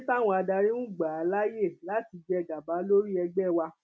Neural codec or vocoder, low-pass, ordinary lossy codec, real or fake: none; none; none; real